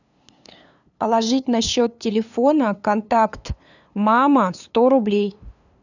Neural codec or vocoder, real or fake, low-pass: codec, 16 kHz, 2 kbps, FunCodec, trained on LibriTTS, 25 frames a second; fake; 7.2 kHz